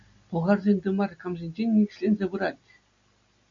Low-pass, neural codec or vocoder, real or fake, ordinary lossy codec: 7.2 kHz; none; real; AAC, 48 kbps